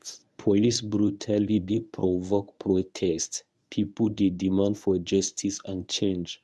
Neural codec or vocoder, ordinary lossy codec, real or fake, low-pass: codec, 24 kHz, 0.9 kbps, WavTokenizer, medium speech release version 1; none; fake; none